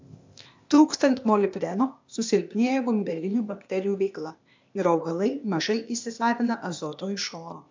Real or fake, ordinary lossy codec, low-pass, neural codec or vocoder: fake; MP3, 64 kbps; 7.2 kHz; codec, 16 kHz, 0.8 kbps, ZipCodec